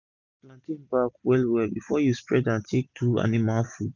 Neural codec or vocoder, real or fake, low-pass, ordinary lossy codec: none; real; 7.2 kHz; none